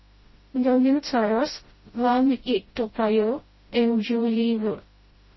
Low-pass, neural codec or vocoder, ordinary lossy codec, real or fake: 7.2 kHz; codec, 16 kHz, 0.5 kbps, FreqCodec, smaller model; MP3, 24 kbps; fake